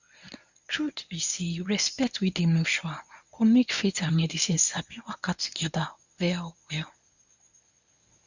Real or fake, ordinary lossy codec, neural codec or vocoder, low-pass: fake; none; codec, 24 kHz, 0.9 kbps, WavTokenizer, medium speech release version 1; 7.2 kHz